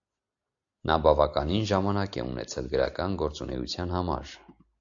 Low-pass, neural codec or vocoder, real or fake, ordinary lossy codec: 7.2 kHz; none; real; AAC, 64 kbps